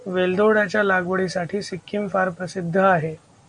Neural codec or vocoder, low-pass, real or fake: none; 9.9 kHz; real